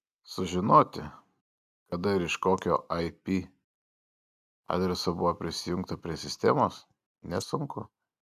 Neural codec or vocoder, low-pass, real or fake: none; 14.4 kHz; real